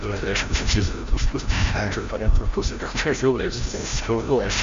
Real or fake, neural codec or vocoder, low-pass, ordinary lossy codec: fake; codec, 16 kHz, 0.5 kbps, FreqCodec, larger model; 7.2 kHz; MP3, 48 kbps